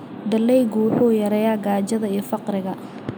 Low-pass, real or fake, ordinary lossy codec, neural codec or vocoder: none; real; none; none